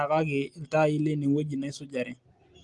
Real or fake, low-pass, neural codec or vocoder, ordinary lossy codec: real; 10.8 kHz; none; Opus, 24 kbps